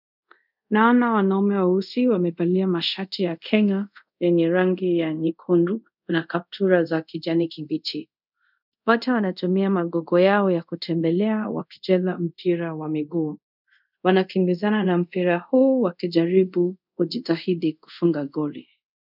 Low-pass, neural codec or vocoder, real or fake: 5.4 kHz; codec, 24 kHz, 0.5 kbps, DualCodec; fake